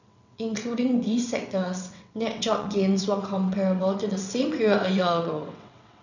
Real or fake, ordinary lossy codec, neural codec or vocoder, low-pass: fake; none; vocoder, 22.05 kHz, 80 mel bands, WaveNeXt; 7.2 kHz